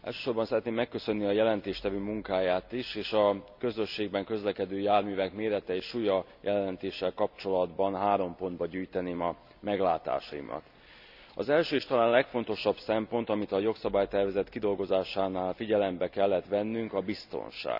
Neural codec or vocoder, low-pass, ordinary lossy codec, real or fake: none; 5.4 kHz; none; real